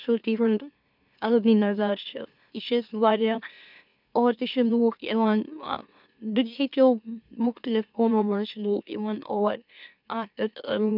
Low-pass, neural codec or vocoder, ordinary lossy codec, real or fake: 5.4 kHz; autoencoder, 44.1 kHz, a latent of 192 numbers a frame, MeloTTS; none; fake